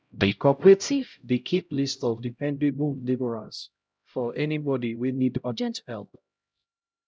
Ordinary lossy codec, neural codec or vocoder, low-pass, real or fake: none; codec, 16 kHz, 0.5 kbps, X-Codec, HuBERT features, trained on LibriSpeech; none; fake